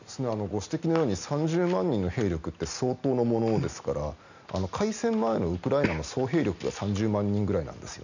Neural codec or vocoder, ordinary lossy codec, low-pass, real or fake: none; none; 7.2 kHz; real